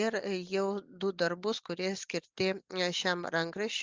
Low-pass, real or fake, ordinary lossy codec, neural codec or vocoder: 7.2 kHz; real; Opus, 24 kbps; none